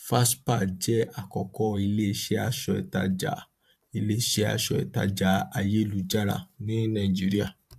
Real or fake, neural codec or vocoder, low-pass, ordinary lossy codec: fake; vocoder, 44.1 kHz, 128 mel bands every 512 samples, BigVGAN v2; 14.4 kHz; AAC, 96 kbps